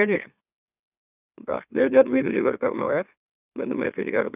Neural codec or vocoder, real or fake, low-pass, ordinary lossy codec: autoencoder, 44.1 kHz, a latent of 192 numbers a frame, MeloTTS; fake; 3.6 kHz; none